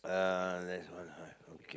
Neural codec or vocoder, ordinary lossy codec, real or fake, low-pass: none; none; real; none